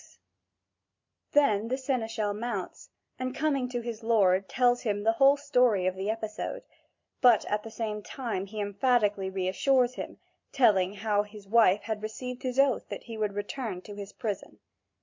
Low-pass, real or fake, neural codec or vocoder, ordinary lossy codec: 7.2 kHz; real; none; MP3, 48 kbps